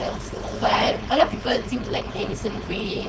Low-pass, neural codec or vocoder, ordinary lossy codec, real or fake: none; codec, 16 kHz, 4.8 kbps, FACodec; none; fake